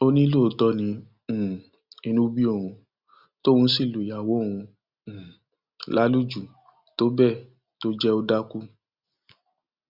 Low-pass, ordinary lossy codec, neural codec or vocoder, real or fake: 5.4 kHz; Opus, 64 kbps; none; real